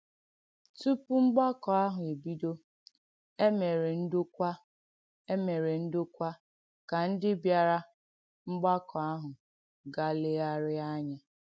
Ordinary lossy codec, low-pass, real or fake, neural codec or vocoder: none; none; real; none